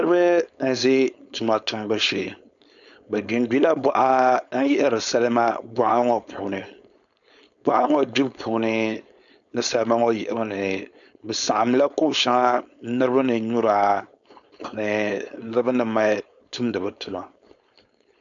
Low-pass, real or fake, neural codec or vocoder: 7.2 kHz; fake; codec, 16 kHz, 4.8 kbps, FACodec